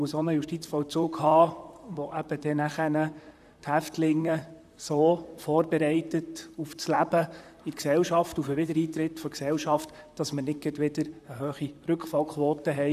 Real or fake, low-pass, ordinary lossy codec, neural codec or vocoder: fake; 14.4 kHz; none; vocoder, 44.1 kHz, 128 mel bands, Pupu-Vocoder